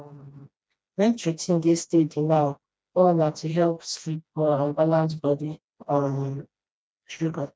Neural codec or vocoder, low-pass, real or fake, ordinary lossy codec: codec, 16 kHz, 1 kbps, FreqCodec, smaller model; none; fake; none